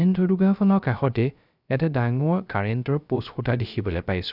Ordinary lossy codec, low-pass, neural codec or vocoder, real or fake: none; 5.4 kHz; codec, 16 kHz, 0.3 kbps, FocalCodec; fake